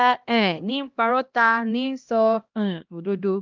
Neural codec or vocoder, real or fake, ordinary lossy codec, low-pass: codec, 16 kHz, 1 kbps, X-Codec, HuBERT features, trained on LibriSpeech; fake; Opus, 32 kbps; 7.2 kHz